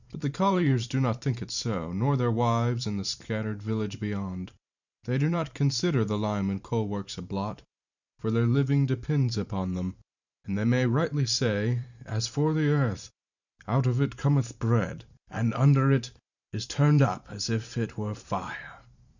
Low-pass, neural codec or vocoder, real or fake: 7.2 kHz; vocoder, 44.1 kHz, 128 mel bands every 512 samples, BigVGAN v2; fake